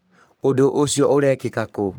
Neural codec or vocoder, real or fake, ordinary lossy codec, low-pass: codec, 44.1 kHz, 3.4 kbps, Pupu-Codec; fake; none; none